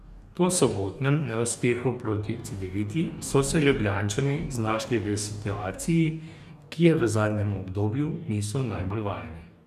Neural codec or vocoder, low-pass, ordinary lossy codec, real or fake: codec, 44.1 kHz, 2.6 kbps, DAC; 14.4 kHz; none; fake